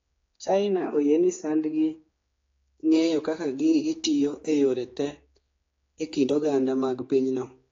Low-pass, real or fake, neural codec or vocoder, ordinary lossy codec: 7.2 kHz; fake; codec, 16 kHz, 4 kbps, X-Codec, HuBERT features, trained on general audio; AAC, 32 kbps